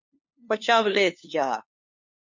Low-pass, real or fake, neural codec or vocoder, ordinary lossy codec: 7.2 kHz; fake; codec, 16 kHz, 2 kbps, FunCodec, trained on LibriTTS, 25 frames a second; MP3, 48 kbps